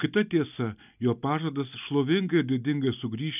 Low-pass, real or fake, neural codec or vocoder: 3.6 kHz; real; none